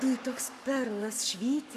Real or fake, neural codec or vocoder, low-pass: fake; codec, 44.1 kHz, 7.8 kbps, Pupu-Codec; 14.4 kHz